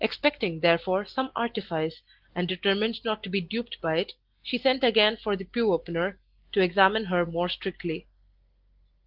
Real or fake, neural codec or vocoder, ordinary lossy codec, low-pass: real; none; Opus, 16 kbps; 5.4 kHz